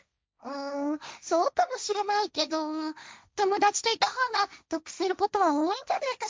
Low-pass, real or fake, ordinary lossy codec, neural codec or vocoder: none; fake; none; codec, 16 kHz, 1.1 kbps, Voila-Tokenizer